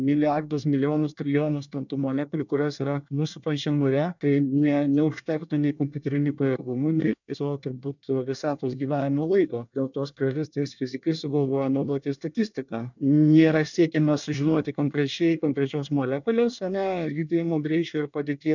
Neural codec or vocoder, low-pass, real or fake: codec, 24 kHz, 1 kbps, SNAC; 7.2 kHz; fake